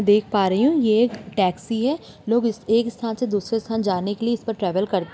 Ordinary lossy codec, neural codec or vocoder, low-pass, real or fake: none; none; none; real